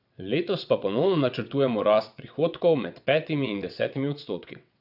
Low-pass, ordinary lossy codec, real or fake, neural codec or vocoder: 5.4 kHz; none; fake; vocoder, 22.05 kHz, 80 mel bands, WaveNeXt